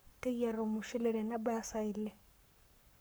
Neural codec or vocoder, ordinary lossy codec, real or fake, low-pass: codec, 44.1 kHz, 7.8 kbps, Pupu-Codec; none; fake; none